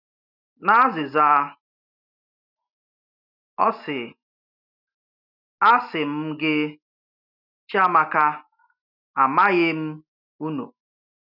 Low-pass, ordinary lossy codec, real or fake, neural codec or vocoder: 5.4 kHz; none; real; none